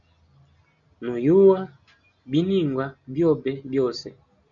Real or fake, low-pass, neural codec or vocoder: real; 7.2 kHz; none